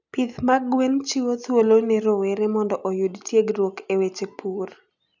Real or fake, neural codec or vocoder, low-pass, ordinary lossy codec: real; none; 7.2 kHz; none